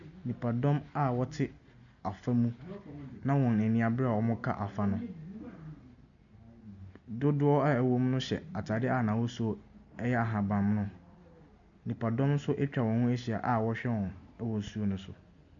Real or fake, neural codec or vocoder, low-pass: real; none; 7.2 kHz